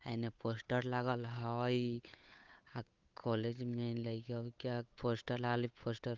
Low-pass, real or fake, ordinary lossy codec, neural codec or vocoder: 7.2 kHz; fake; Opus, 24 kbps; codec, 16 kHz, 8 kbps, FunCodec, trained on Chinese and English, 25 frames a second